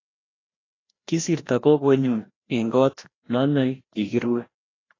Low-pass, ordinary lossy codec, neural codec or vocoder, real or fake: 7.2 kHz; AAC, 32 kbps; codec, 16 kHz, 1 kbps, FreqCodec, larger model; fake